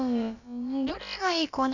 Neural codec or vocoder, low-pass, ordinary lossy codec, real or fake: codec, 16 kHz, about 1 kbps, DyCAST, with the encoder's durations; 7.2 kHz; none; fake